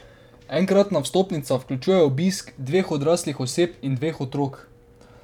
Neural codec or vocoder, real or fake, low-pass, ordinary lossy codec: vocoder, 44.1 kHz, 128 mel bands every 512 samples, BigVGAN v2; fake; 19.8 kHz; none